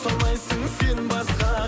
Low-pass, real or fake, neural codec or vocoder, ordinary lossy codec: none; real; none; none